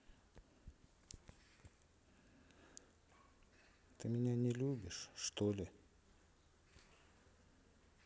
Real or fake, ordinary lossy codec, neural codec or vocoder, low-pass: real; none; none; none